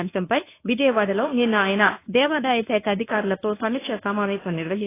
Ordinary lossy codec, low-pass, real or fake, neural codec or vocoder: AAC, 16 kbps; 3.6 kHz; fake; codec, 24 kHz, 0.9 kbps, WavTokenizer, medium speech release version 1